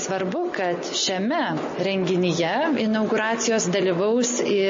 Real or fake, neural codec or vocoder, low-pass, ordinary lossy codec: real; none; 7.2 kHz; MP3, 32 kbps